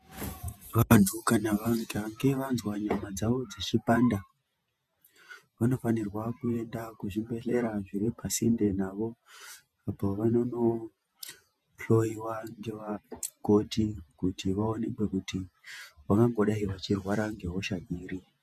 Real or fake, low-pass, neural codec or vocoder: fake; 14.4 kHz; vocoder, 48 kHz, 128 mel bands, Vocos